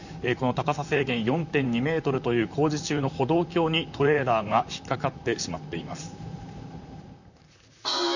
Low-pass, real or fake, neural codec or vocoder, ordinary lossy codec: 7.2 kHz; fake; vocoder, 44.1 kHz, 128 mel bands, Pupu-Vocoder; none